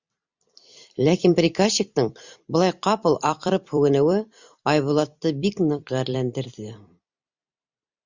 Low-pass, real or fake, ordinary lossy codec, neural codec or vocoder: 7.2 kHz; fake; Opus, 64 kbps; vocoder, 44.1 kHz, 128 mel bands every 256 samples, BigVGAN v2